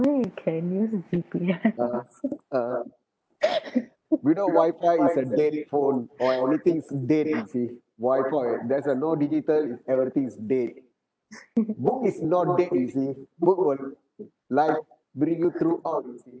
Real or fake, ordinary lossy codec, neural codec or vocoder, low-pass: real; none; none; none